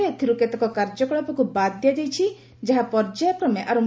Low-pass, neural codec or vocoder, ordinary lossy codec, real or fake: none; none; none; real